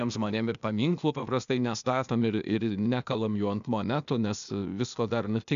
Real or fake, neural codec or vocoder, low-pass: fake; codec, 16 kHz, 0.8 kbps, ZipCodec; 7.2 kHz